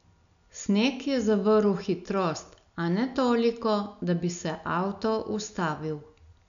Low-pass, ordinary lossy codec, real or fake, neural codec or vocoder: 7.2 kHz; none; real; none